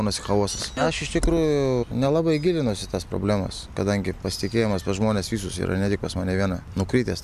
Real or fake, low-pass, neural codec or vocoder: real; 14.4 kHz; none